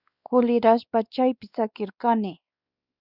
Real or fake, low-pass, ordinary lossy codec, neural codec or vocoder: fake; 5.4 kHz; Opus, 64 kbps; codec, 16 kHz, 2 kbps, X-Codec, WavLM features, trained on Multilingual LibriSpeech